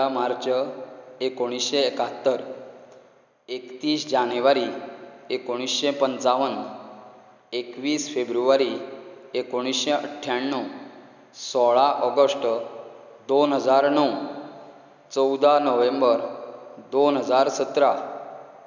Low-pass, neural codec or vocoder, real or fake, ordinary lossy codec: 7.2 kHz; none; real; none